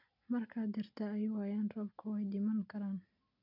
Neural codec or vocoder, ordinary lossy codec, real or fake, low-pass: none; none; real; 5.4 kHz